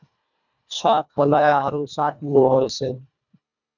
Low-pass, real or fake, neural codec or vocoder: 7.2 kHz; fake; codec, 24 kHz, 1.5 kbps, HILCodec